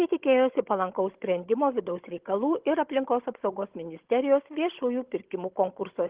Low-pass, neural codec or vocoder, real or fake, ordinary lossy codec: 3.6 kHz; codec, 16 kHz, 16 kbps, FunCodec, trained on Chinese and English, 50 frames a second; fake; Opus, 16 kbps